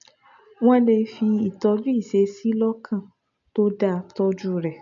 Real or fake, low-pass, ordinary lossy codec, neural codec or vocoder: real; 7.2 kHz; none; none